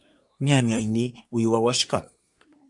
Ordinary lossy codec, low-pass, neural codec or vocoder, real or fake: MP3, 96 kbps; 10.8 kHz; codec, 24 kHz, 1 kbps, SNAC; fake